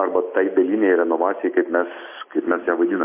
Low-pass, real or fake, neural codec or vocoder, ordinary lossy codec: 3.6 kHz; real; none; MP3, 24 kbps